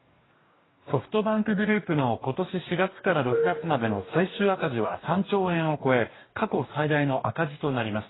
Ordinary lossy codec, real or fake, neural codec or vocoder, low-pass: AAC, 16 kbps; fake; codec, 44.1 kHz, 2.6 kbps, DAC; 7.2 kHz